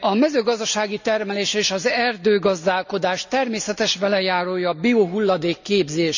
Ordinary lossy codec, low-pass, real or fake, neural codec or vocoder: none; 7.2 kHz; real; none